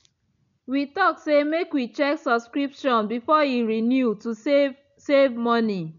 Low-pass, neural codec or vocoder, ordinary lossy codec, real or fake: 7.2 kHz; none; none; real